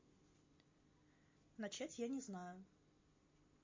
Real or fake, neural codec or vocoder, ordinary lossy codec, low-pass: real; none; MP3, 32 kbps; 7.2 kHz